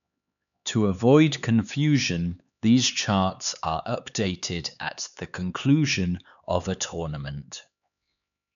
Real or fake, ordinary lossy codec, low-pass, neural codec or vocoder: fake; none; 7.2 kHz; codec, 16 kHz, 4 kbps, X-Codec, HuBERT features, trained on LibriSpeech